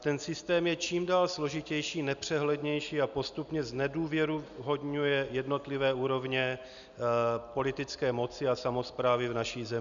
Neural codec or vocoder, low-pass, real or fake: none; 7.2 kHz; real